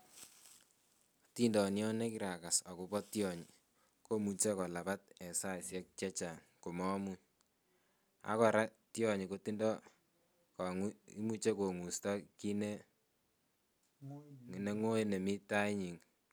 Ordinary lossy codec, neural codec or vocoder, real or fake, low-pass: none; none; real; none